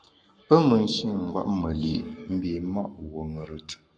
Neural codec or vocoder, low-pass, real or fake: codec, 44.1 kHz, 7.8 kbps, Pupu-Codec; 9.9 kHz; fake